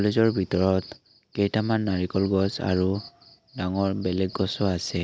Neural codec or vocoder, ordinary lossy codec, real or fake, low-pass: none; none; real; none